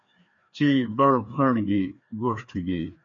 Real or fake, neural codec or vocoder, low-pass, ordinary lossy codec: fake; codec, 16 kHz, 2 kbps, FreqCodec, larger model; 7.2 kHz; MP3, 48 kbps